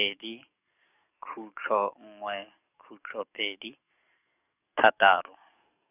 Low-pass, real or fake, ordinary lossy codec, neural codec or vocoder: 3.6 kHz; fake; none; codec, 44.1 kHz, 7.8 kbps, DAC